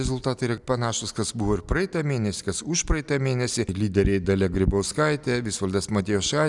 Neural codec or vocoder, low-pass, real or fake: none; 10.8 kHz; real